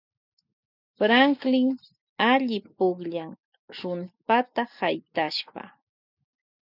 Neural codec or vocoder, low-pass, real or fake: none; 5.4 kHz; real